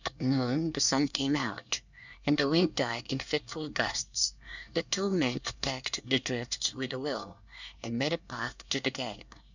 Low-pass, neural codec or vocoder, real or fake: 7.2 kHz; codec, 24 kHz, 1 kbps, SNAC; fake